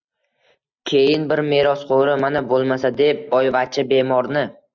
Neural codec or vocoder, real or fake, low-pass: none; real; 7.2 kHz